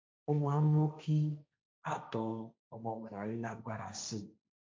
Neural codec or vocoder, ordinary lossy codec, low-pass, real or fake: codec, 16 kHz, 1.1 kbps, Voila-Tokenizer; none; none; fake